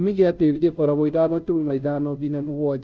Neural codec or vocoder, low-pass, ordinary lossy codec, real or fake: codec, 16 kHz, 0.5 kbps, FunCodec, trained on Chinese and English, 25 frames a second; none; none; fake